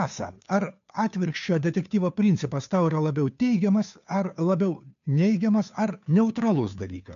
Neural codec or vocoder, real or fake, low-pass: codec, 16 kHz, 2 kbps, FunCodec, trained on Chinese and English, 25 frames a second; fake; 7.2 kHz